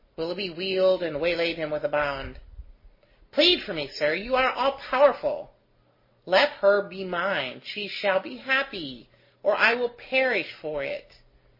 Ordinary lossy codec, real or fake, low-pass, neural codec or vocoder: MP3, 24 kbps; real; 5.4 kHz; none